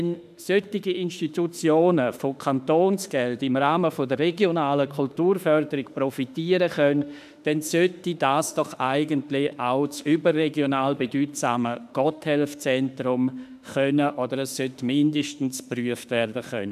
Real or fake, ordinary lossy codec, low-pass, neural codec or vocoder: fake; none; 14.4 kHz; autoencoder, 48 kHz, 32 numbers a frame, DAC-VAE, trained on Japanese speech